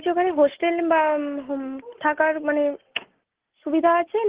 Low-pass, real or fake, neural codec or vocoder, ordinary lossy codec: 3.6 kHz; real; none; Opus, 16 kbps